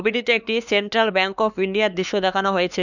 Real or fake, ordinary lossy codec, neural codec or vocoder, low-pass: fake; none; codec, 16 kHz, 2 kbps, X-Codec, HuBERT features, trained on LibriSpeech; 7.2 kHz